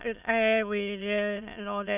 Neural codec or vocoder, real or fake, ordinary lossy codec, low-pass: autoencoder, 22.05 kHz, a latent of 192 numbers a frame, VITS, trained on many speakers; fake; none; 3.6 kHz